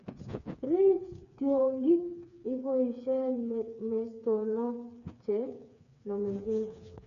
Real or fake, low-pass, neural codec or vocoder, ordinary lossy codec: fake; 7.2 kHz; codec, 16 kHz, 4 kbps, FreqCodec, smaller model; none